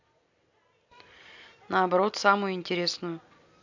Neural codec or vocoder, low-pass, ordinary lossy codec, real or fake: none; 7.2 kHz; MP3, 64 kbps; real